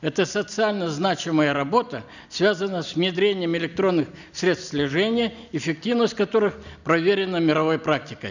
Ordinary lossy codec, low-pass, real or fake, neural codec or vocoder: none; 7.2 kHz; real; none